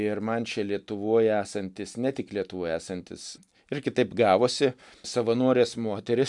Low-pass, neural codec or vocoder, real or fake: 10.8 kHz; none; real